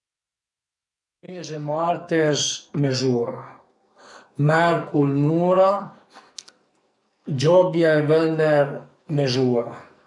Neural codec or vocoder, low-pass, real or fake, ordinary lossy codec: codec, 44.1 kHz, 2.6 kbps, SNAC; 10.8 kHz; fake; MP3, 96 kbps